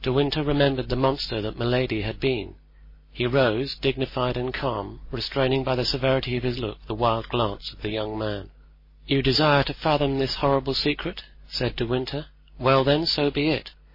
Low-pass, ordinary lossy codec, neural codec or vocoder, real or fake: 5.4 kHz; MP3, 24 kbps; none; real